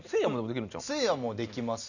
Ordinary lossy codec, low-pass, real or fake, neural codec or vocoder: none; 7.2 kHz; real; none